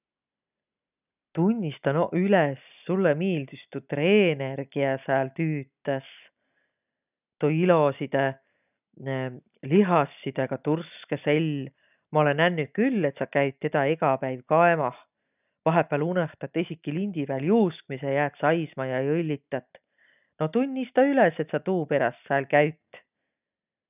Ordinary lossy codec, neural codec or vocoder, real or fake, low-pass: none; none; real; 3.6 kHz